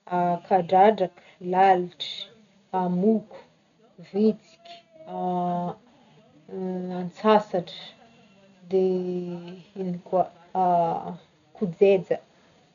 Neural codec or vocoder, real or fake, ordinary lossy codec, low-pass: none; real; none; 7.2 kHz